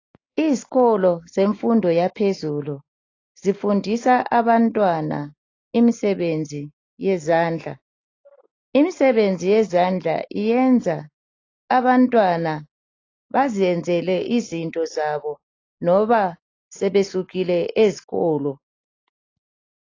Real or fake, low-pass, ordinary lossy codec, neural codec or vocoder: real; 7.2 kHz; AAC, 32 kbps; none